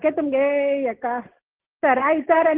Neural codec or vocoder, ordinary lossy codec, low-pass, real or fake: none; Opus, 16 kbps; 3.6 kHz; real